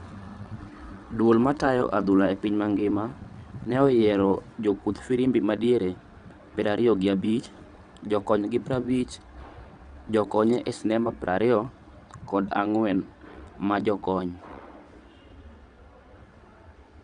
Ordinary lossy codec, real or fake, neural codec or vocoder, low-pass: none; fake; vocoder, 22.05 kHz, 80 mel bands, WaveNeXt; 9.9 kHz